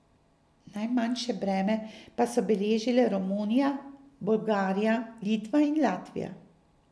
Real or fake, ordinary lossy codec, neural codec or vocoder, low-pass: real; none; none; none